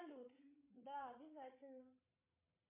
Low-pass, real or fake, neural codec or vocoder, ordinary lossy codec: 3.6 kHz; fake; codec, 16 kHz, 16 kbps, FreqCodec, larger model; MP3, 24 kbps